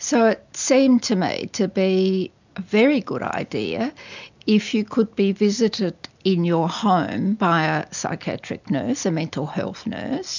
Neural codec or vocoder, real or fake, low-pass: none; real; 7.2 kHz